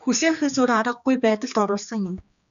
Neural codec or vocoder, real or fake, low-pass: codec, 16 kHz, 2 kbps, X-Codec, HuBERT features, trained on general audio; fake; 7.2 kHz